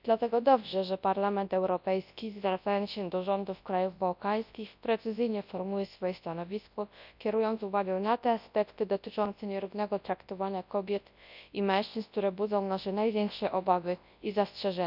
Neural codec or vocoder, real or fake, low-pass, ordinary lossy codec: codec, 24 kHz, 0.9 kbps, WavTokenizer, large speech release; fake; 5.4 kHz; none